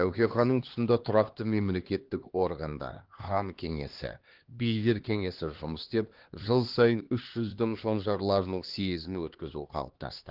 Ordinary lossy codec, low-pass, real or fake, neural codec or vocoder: Opus, 16 kbps; 5.4 kHz; fake; codec, 16 kHz, 2 kbps, X-Codec, HuBERT features, trained on LibriSpeech